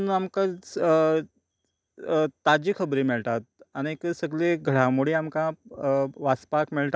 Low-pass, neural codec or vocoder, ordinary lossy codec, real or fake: none; none; none; real